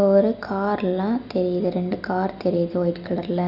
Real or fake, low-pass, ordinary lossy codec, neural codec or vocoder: real; 5.4 kHz; none; none